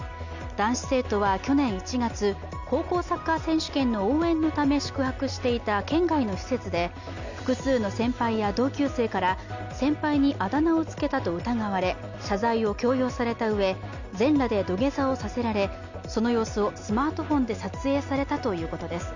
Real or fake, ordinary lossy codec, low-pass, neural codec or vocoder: real; none; 7.2 kHz; none